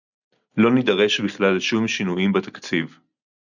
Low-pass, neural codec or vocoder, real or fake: 7.2 kHz; none; real